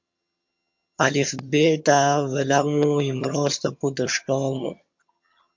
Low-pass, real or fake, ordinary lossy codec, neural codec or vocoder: 7.2 kHz; fake; MP3, 48 kbps; vocoder, 22.05 kHz, 80 mel bands, HiFi-GAN